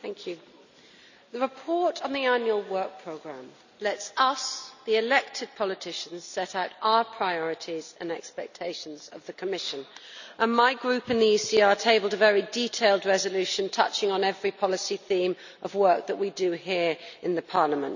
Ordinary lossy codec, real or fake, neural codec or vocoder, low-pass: none; real; none; 7.2 kHz